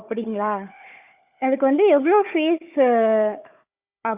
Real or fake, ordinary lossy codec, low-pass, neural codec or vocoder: fake; Opus, 64 kbps; 3.6 kHz; codec, 16 kHz, 4 kbps, FunCodec, trained on Chinese and English, 50 frames a second